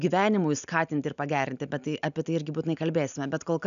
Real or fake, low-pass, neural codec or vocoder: real; 7.2 kHz; none